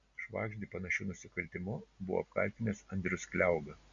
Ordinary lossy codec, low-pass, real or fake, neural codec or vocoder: Opus, 64 kbps; 7.2 kHz; real; none